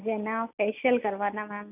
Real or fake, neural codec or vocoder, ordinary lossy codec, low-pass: real; none; none; 3.6 kHz